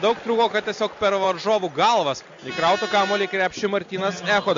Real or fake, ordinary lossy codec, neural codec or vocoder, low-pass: real; MP3, 48 kbps; none; 7.2 kHz